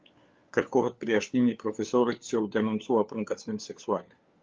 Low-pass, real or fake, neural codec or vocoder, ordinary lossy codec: 7.2 kHz; fake; codec, 16 kHz, 4 kbps, FunCodec, trained on LibriTTS, 50 frames a second; Opus, 24 kbps